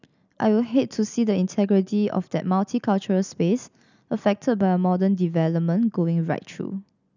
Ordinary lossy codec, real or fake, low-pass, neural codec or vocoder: none; real; 7.2 kHz; none